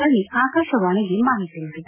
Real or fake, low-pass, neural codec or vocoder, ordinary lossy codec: real; 3.6 kHz; none; none